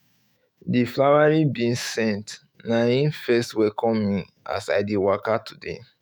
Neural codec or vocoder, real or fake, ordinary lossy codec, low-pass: autoencoder, 48 kHz, 128 numbers a frame, DAC-VAE, trained on Japanese speech; fake; none; none